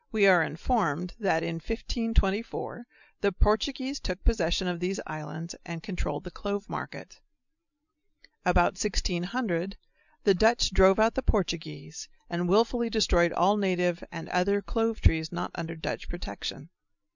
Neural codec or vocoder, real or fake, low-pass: none; real; 7.2 kHz